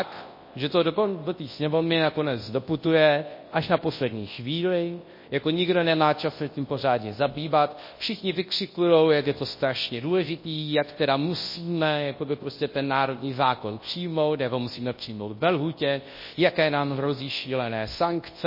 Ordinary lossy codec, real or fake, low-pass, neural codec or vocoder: MP3, 24 kbps; fake; 5.4 kHz; codec, 24 kHz, 0.9 kbps, WavTokenizer, large speech release